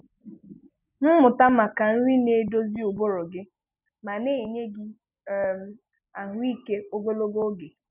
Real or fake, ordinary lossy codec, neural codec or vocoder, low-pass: real; none; none; 3.6 kHz